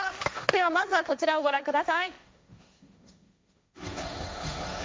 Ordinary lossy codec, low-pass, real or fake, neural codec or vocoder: none; none; fake; codec, 16 kHz, 1.1 kbps, Voila-Tokenizer